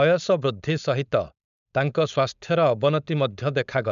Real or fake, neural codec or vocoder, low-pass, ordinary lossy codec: fake; codec, 16 kHz, 4.8 kbps, FACodec; 7.2 kHz; none